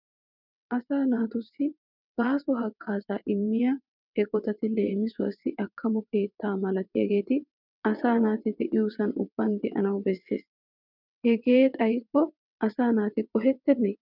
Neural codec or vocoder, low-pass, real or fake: vocoder, 22.05 kHz, 80 mel bands, WaveNeXt; 5.4 kHz; fake